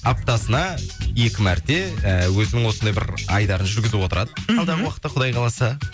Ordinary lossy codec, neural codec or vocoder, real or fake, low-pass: none; none; real; none